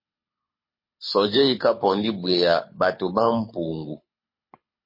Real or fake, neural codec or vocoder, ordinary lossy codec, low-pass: fake; codec, 24 kHz, 6 kbps, HILCodec; MP3, 24 kbps; 5.4 kHz